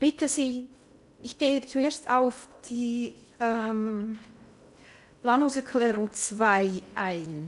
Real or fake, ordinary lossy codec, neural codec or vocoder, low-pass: fake; none; codec, 16 kHz in and 24 kHz out, 0.6 kbps, FocalCodec, streaming, 2048 codes; 10.8 kHz